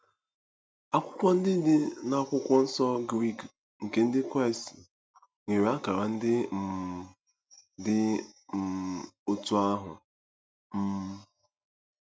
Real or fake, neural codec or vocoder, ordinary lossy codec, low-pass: real; none; none; none